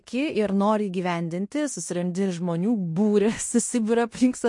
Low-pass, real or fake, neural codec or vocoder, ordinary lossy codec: 10.8 kHz; fake; codec, 16 kHz in and 24 kHz out, 0.9 kbps, LongCat-Audio-Codec, fine tuned four codebook decoder; MP3, 48 kbps